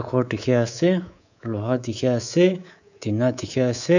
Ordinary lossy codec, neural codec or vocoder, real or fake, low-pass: none; codec, 24 kHz, 3.1 kbps, DualCodec; fake; 7.2 kHz